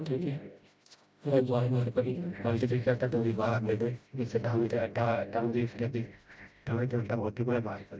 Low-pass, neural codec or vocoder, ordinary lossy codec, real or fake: none; codec, 16 kHz, 0.5 kbps, FreqCodec, smaller model; none; fake